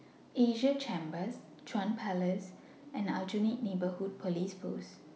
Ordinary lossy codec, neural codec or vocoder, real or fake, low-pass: none; none; real; none